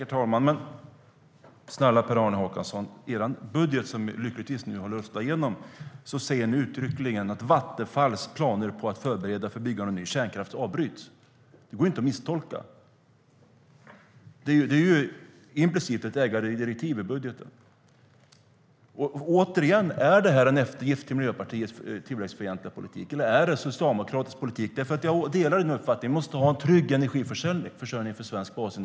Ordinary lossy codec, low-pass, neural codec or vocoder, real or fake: none; none; none; real